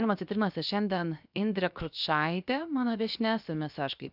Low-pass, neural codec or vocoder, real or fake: 5.4 kHz; codec, 16 kHz, 0.7 kbps, FocalCodec; fake